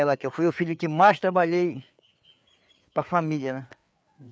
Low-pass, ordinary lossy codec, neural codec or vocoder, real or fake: none; none; codec, 16 kHz, 4 kbps, FreqCodec, larger model; fake